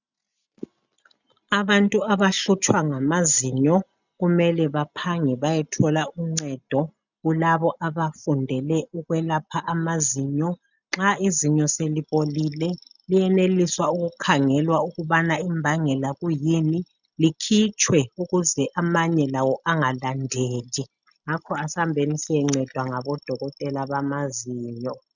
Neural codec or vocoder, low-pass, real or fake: none; 7.2 kHz; real